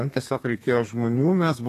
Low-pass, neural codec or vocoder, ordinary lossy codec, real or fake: 14.4 kHz; codec, 32 kHz, 1.9 kbps, SNAC; AAC, 48 kbps; fake